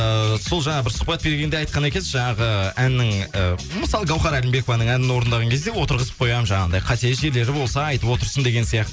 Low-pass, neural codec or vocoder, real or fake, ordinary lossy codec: none; none; real; none